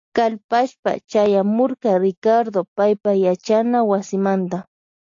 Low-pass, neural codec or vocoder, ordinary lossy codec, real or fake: 7.2 kHz; none; AAC, 48 kbps; real